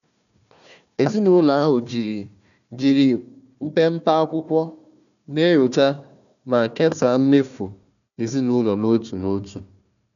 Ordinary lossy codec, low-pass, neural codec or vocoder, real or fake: none; 7.2 kHz; codec, 16 kHz, 1 kbps, FunCodec, trained on Chinese and English, 50 frames a second; fake